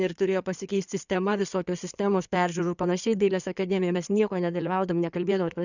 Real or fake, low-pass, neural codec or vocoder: fake; 7.2 kHz; codec, 16 kHz in and 24 kHz out, 2.2 kbps, FireRedTTS-2 codec